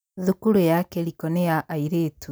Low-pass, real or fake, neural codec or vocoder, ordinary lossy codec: none; real; none; none